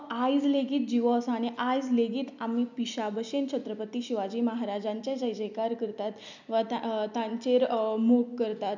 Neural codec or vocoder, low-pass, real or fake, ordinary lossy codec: none; 7.2 kHz; real; none